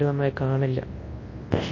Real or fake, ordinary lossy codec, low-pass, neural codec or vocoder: fake; MP3, 32 kbps; 7.2 kHz; codec, 24 kHz, 0.9 kbps, WavTokenizer, large speech release